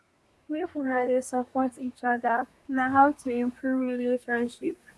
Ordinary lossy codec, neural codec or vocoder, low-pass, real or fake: none; codec, 24 kHz, 1 kbps, SNAC; none; fake